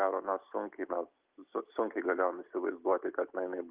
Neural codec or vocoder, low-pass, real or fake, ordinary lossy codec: none; 3.6 kHz; real; Opus, 32 kbps